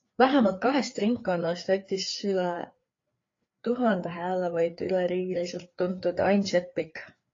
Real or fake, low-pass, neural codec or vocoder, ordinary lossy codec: fake; 7.2 kHz; codec, 16 kHz, 4 kbps, FreqCodec, larger model; AAC, 32 kbps